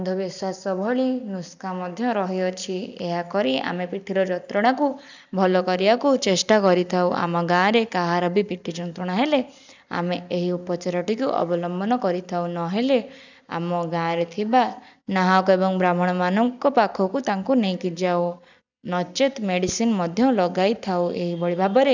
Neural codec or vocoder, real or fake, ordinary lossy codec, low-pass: none; real; none; 7.2 kHz